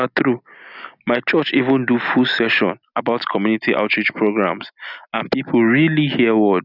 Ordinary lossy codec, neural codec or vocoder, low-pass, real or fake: none; none; 5.4 kHz; real